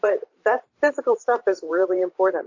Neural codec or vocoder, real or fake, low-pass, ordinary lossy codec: vocoder, 44.1 kHz, 128 mel bands every 512 samples, BigVGAN v2; fake; 7.2 kHz; AAC, 48 kbps